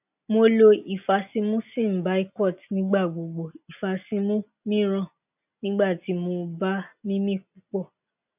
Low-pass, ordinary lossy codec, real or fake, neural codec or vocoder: 3.6 kHz; none; real; none